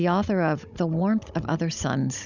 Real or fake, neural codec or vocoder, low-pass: fake; codec, 16 kHz, 16 kbps, FunCodec, trained on Chinese and English, 50 frames a second; 7.2 kHz